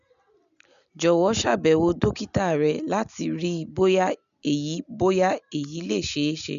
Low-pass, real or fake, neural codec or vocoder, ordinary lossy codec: 7.2 kHz; real; none; none